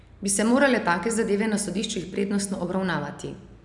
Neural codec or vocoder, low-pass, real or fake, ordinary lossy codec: none; 10.8 kHz; real; none